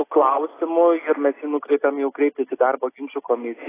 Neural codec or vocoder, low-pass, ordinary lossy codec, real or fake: none; 3.6 kHz; AAC, 16 kbps; real